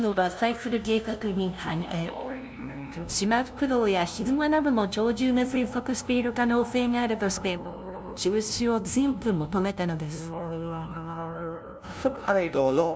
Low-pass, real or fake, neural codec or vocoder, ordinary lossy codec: none; fake; codec, 16 kHz, 0.5 kbps, FunCodec, trained on LibriTTS, 25 frames a second; none